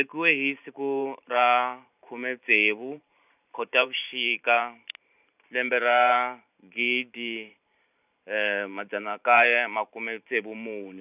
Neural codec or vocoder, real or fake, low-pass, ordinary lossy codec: vocoder, 44.1 kHz, 128 mel bands every 512 samples, BigVGAN v2; fake; 3.6 kHz; none